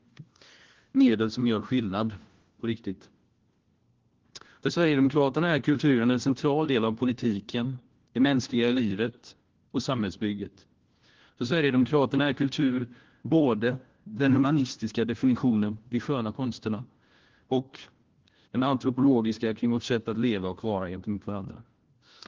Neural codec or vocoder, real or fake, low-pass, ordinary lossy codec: codec, 16 kHz, 1 kbps, FunCodec, trained on LibriTTS, 50 frames a second; fake; 7.2 kHz; Opus, 16 kbps